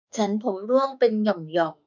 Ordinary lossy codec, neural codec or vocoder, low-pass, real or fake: none; autoencoder, 48 kHz, 32 numbers a frame, DAC-VAE, trained on Japanese speech; 7.2 kHz; fake